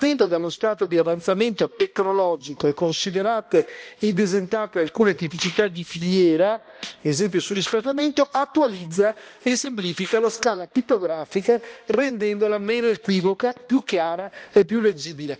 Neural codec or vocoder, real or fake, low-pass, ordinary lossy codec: codec, 16 kHz, 1 kbps, X-Codec, HuBERT features, trained on balanced general audio; fake; none; none